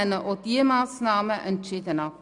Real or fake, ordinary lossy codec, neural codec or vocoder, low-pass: real; none; none; 10.8 kHz